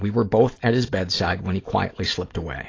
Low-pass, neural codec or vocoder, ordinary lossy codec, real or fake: 7.2 kHz; none; AAC, 32 kbps; real